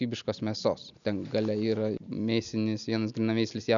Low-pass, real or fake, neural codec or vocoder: 7.2 kHz; real; none